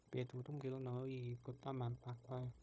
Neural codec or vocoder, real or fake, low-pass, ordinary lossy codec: codec, 16 kHz, 0.9 kbps, LongCat-Audio-Codec; fake; none; none